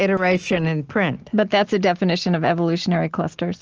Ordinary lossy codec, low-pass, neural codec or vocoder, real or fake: Opus, 16 kbps; 7.2 kHz; none; real